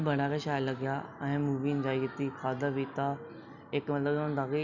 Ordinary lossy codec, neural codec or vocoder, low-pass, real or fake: none; none; 7.2 kHz; real